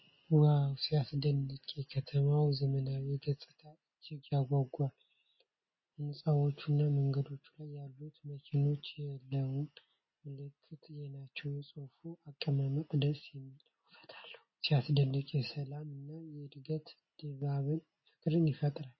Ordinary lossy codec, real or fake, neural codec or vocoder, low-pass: MP3, 24 kbps; real; none; 7.2 kHz